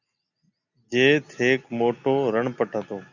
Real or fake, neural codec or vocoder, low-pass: real; none; 7.2 kHz